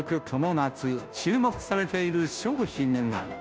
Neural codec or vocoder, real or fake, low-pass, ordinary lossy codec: codec, 16 kHz, 0.5 kbps, FunCodec, trained on Chinese and English, 25 frames a second; fake; none; none